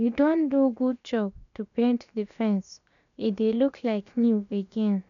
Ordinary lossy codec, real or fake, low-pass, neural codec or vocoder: MP3, 96 kbps; fake; 7.2 kHz; codec, 16 kHz, about 1 kbps, DyCAST, with the encoder's durations